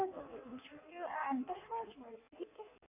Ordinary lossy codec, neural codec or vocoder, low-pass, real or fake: none; codec, 16 kHz in and 24 kHz out, 1.1 kbps, FireRedTTS-2 codec; 3.6 kHz; fake